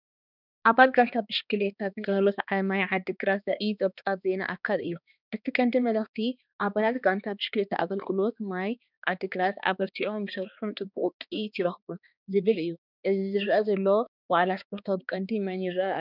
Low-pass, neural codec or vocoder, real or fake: 5.4 kHz; codec, 16 kHz, 2 kbps, X-Codec, HuBERT features, trained on balanced general audio; fake